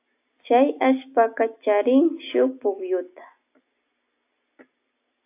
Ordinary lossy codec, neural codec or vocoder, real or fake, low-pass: AAC, 24 kbps; none; real; 3.6 kHz